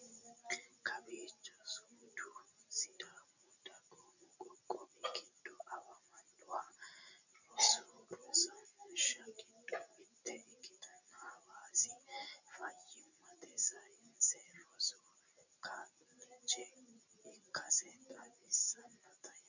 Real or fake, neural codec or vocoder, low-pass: real; none; 7.2 kHz